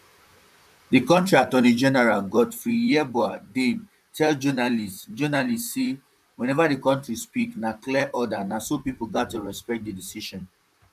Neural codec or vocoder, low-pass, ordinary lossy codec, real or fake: vocoder, 44.1 kHz, 128 mel bands, Pupu-Vocoder; 14.4 kHz; none; fake